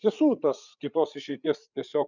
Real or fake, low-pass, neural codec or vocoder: fake; 7.2 kHz; codec, 16 kHz, 8 kbps, FreqCodec, larger model